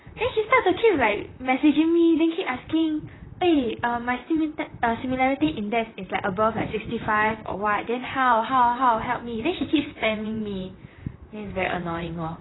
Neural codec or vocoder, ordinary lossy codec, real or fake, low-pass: vocoder, 44.1 kHz, 128 mel bands, Pupu-Vocoder; AAC, 16 kbps; fake; 7.2 kHz